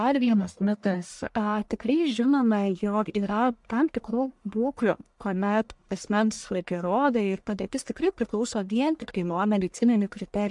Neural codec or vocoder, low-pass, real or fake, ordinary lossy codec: codec, 44.1 kHz, 1.7 kbps, Pupu-Codec; 10.8 kHz; fake; MP3, 64 kbps